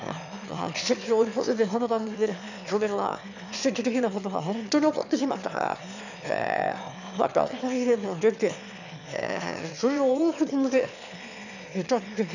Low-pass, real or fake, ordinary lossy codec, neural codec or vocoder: 7.2 kHz; fake; none; autoencoder, 22.05 kHz, a latent of 192 numbers a frame, VITS, trained on one speaker